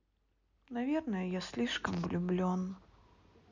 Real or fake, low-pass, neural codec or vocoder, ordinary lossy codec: real; 7.2 kHz; none; none